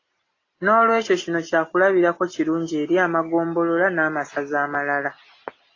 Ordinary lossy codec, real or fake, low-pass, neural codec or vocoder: AAC, 32 kbps; real; 7.2 kHz; none